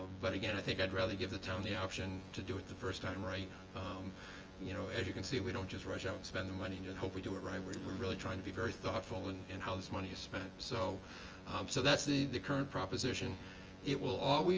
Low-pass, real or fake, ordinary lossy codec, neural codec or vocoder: 7.2 kHz; fake; Opus, 24 kbps; vocoder, 24 kHz, 100 mel bands, Vocos